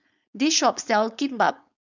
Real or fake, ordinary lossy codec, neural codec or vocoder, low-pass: fake; none; codec, 16 kHz, 4.8 kbps, FACodec; 7.2 kHz